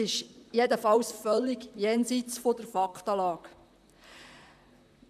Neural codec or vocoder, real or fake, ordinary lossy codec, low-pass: vocoder, 44.1 kHz, 128 mel bands, Pupu-Vocoder; fake; none; 14.4 kHz